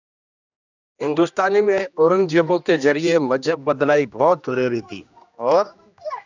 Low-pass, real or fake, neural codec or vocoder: 7.2 kHz; fake; codec, 16 kHz, 1 kbps, X-Codec, HuBERT features, trained on general audio